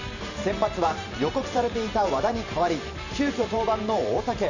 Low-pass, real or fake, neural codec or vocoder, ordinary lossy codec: 7.2 kHz; fake; vocoder, 44.1 kHz, 128 mel bands every 512 samples, BigVGAN v2; none